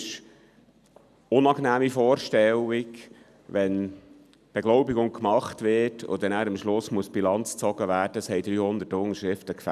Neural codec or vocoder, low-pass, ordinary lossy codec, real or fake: none; 14.4 kHz; none; real